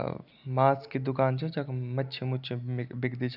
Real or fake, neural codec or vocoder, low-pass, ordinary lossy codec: real; none; 5.4 kHz; none